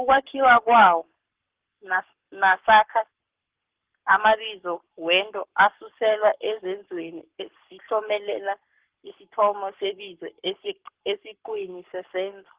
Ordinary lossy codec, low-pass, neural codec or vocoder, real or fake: Opus, 16 kbps; 3.6 kHz; codec, 44.1 kHz, 7.8 kbps, Pupu-Codec; fake